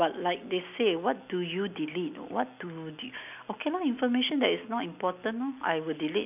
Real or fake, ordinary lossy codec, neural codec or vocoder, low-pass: real; none; none; 3.6 kHz